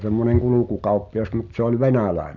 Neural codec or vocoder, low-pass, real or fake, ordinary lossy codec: none; 7.2 kHz; real; none